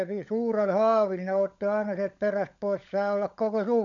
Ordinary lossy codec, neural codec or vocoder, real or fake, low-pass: none; codec, 16 kHz, 16 kbps, FunCodec, trained on LibriTTS, 50 frames a second; fake; 7.2 kHz